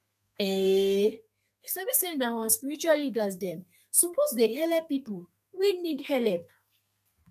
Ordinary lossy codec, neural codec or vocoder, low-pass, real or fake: none; codec, 32 kHz, 1.9 kbps, SNAC; 14.4 kHz; fake